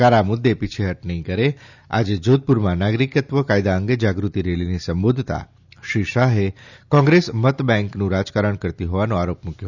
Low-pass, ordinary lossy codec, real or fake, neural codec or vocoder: 7.2 kHz; none; real; none